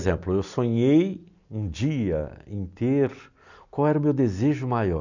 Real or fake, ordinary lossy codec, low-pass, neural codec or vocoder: real; none; 7.2 kHz; none